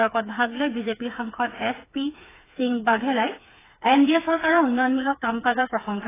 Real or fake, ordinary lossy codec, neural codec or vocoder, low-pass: fake; AAC, 16 kbps; codec, 16 kHz, 4 kbps, FreqCodec, smaller model; 3.6 kHz